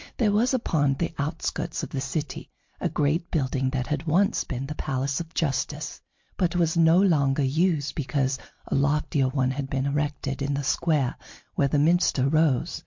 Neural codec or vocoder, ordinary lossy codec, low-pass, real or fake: none; MP3, 48 kbps; 7.2 kHz; real